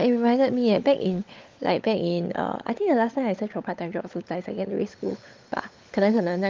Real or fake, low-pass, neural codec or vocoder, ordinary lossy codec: fake; 7.2 kHz; codec, 16 kHz, 16 kbps, FunCodec, trained on LibriTTS, 50 frames a second; Opus, 32 kbps